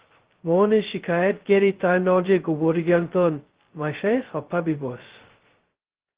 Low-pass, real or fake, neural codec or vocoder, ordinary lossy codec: 3.6 kHz; fake; codec, 16 kHz, 0.2 kbps, FocalCodec; Opus, 16 kbps